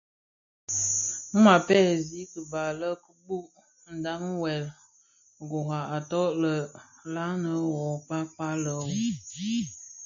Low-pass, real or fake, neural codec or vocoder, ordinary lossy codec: 7.2 kHz; real; none; AAC, 64 kbps